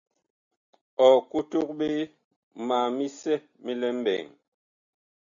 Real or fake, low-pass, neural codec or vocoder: real; 7.2 kHz; none